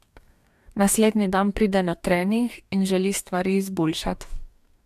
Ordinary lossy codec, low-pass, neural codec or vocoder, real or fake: AAC, 96 kbps; 14.4 kHz; codec, 44.1 kHz, 2.6 kbps, DAC; fake